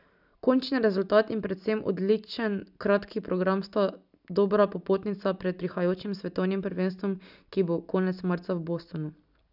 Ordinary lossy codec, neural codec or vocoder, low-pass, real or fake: none; none; 5.4 kHz; real